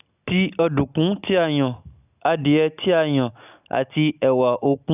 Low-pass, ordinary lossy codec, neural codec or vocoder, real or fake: 3.6 kHz; none; none; real